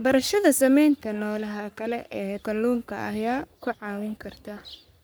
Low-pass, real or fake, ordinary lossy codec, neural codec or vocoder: none; fake; none; codec, 44.1 kHz, 3.4 kbps, Pupu-Codec